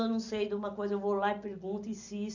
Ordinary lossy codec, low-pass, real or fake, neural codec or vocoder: none; 7.2 kHz; real; none